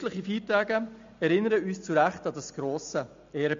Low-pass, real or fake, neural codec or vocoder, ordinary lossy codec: 7.2 kHz; real; none; MP3, 48 kbps